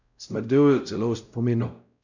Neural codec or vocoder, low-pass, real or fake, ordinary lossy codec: codec, 16 kHz, 0.5 kbps, X-Codec, WavLM features, trained on Multilingual LibriSpeech; 7.2 kHz; fake; none